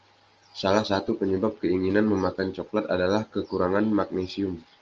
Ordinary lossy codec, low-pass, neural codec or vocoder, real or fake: Opus, 24 kbps; 7.2 kHz; none; real